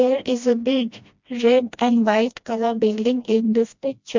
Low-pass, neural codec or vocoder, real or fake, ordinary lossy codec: 7.2 kHz; codec, 16 kHz, 1 kbps, FreqCodec, smaller model; fake; MP3, 64 kbps